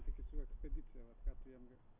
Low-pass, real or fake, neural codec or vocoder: 3.6 kHz; real; none